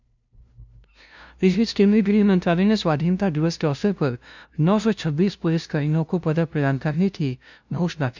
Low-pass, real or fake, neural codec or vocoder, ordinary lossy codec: 7.2 kHz; fake; codec, 16 kHz, 0.5 kbps, FunCodec, trained on LibriTTS, 25 frames a second; none